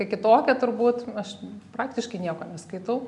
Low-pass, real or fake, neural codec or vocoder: 10.8 kHz; real; none